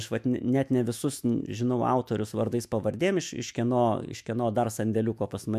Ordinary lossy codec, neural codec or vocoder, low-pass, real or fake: MP3, 96 kbps; autoencoder, 48 kHz, 128 numbers a frame, DAC-VAE, trained on Japanese speech; 14.4 kHz; fake